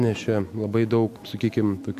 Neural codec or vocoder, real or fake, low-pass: none; real; 14.4 kHz